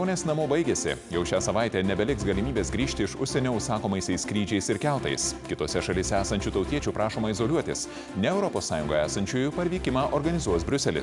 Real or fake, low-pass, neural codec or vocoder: real; 10.8 kHz; none